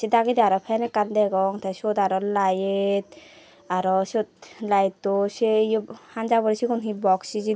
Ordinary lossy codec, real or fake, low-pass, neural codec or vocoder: none; real; none; none